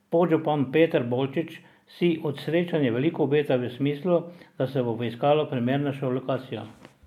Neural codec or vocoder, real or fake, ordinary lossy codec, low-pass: none; real; MP3, 96 kbps; 19.8 kHz